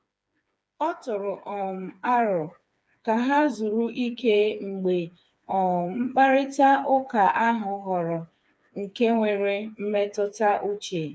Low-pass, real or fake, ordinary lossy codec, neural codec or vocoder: none; fake; none; codec, 16 kHz, 4 kbps, FreqCodec, smaller model